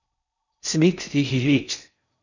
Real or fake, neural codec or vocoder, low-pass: fake; codec, 16 kHz in and 24 kHz out, 0.6 kbps, FocalCodec, streaming, 4096 codes; 7.2 kHz